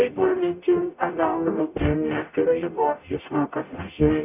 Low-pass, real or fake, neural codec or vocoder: 3.6 kHz; fake; codec, 44.1 kHz, 0.9 kbps, DAC